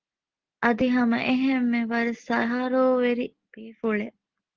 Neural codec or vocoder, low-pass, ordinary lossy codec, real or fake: none; 7.2 kHz; Opus, 16 kbps; real